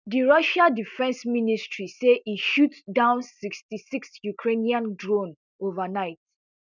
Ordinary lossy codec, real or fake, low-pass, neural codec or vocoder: none; real; 7.2 kHz; none